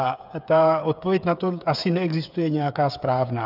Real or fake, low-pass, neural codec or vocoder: fake; 5.4 kHz; codec, 16 kHz, 8 kbps, FreqCodec, smaller model